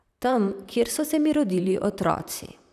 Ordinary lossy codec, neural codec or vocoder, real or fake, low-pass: none; vocoder, 44.1 kHz, 128 mel bands, Pupu-Vocoder; fake; 14.4 kHz